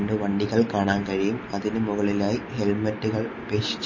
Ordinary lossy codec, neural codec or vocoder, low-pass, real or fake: MP3, 32 kbps; none; 7.2 kHz; real